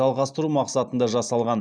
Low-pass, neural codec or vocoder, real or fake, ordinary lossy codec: 9.9 kHz; none; real; none